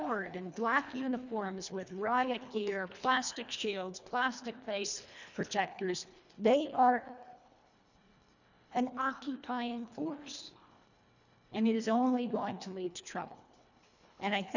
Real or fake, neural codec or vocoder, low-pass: fake; codec, 24 kHz, 1.5 kbps, HILCodec; 7.2 kHz